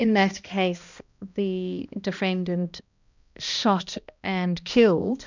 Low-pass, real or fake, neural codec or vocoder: 7.2 kHz; fake; codec, 16 kHz, 1 kbps, X-Codec, HuBERT features, trained on balanced general audio